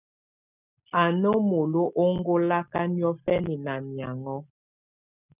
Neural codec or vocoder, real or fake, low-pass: none; real; 3.6 kHz